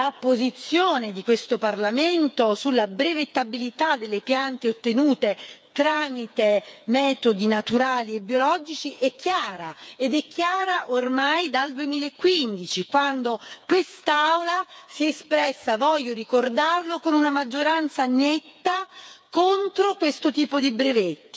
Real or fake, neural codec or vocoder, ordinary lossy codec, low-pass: fake; codec, 16 kHz, 4 kbps, FreqCodec, smaller model; none; none